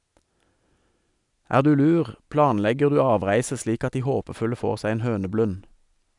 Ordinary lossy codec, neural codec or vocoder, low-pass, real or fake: none; none; 10.8 kHz; real